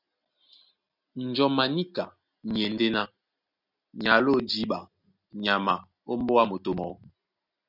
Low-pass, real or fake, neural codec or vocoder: 5.4 kHz; real; none